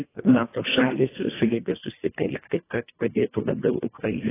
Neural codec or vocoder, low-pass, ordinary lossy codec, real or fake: codec, 24 kHz, 1.5 kbps, HILCodec; 3.6 kHz; AAC, 16 kbps; fake